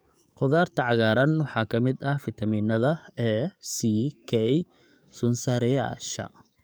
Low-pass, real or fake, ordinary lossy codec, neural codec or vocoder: none; fake; none; codec, 44.1 kHz, 7.8 kbps, DAC